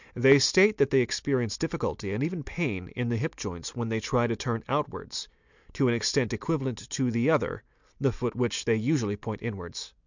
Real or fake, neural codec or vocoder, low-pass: real; none; 7.2 kHz